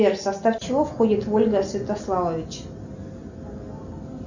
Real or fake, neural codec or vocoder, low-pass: real; none; 7.2 kHz